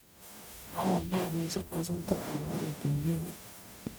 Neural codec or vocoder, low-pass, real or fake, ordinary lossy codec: codec, 44.1 kHz, 0.9 kbps, DAC; none; fake; none